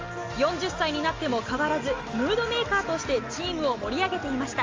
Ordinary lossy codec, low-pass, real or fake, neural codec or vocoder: Opus, 32 kbps; 7.2 kHz; real; none